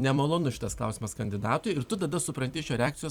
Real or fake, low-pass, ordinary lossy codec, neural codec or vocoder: fake; 19.8 kHz; Opus, 64 kbps; vocoder, 44.1 kHz, 128 mel bands every 256 samples, BigVGAN v2